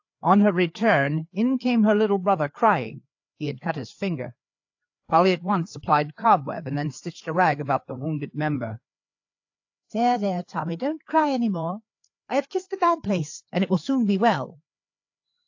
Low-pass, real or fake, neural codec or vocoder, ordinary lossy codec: 7.2 kHz; fake; codec, 16 kHz, 4 kbps, FreqCodec, larger model; AAC, 48 kbps